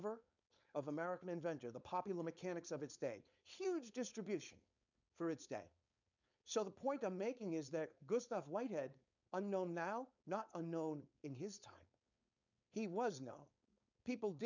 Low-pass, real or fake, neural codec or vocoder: 7.2 kHz; fake; codec, 16 kHz, 4.8 kbps, FACodec